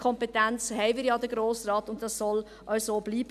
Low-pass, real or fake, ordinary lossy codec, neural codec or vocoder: 14.4 kHz; real; none; none